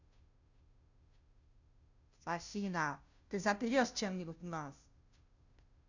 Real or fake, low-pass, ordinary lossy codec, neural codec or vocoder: fake; 7.2 kHz; none; codec, 16 kHz, 0.5 kbps, FunCodec, trained on Chinese and English, 25 frames a second